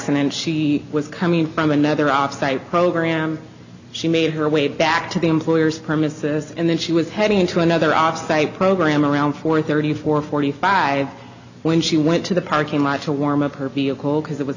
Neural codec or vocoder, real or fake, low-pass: none; real; 7.2 kHz